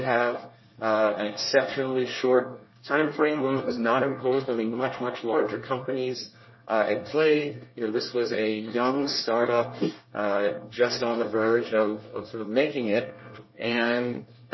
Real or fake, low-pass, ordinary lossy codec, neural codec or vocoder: fake; 7.2 kHz; MP3, 24 kbps; codec, 24 kHz, 1 kbps, SNAC